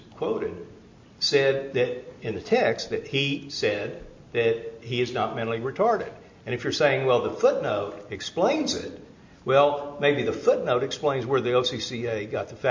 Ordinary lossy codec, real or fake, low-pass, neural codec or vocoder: MP3, 48 kbps; real; 7.2 kHz; none